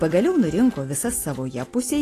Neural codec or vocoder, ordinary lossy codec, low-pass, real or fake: none; AAC, 48 kbps; 14.4 kHz; real